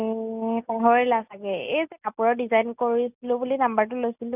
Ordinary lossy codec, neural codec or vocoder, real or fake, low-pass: none; none; real; 3.6 kHz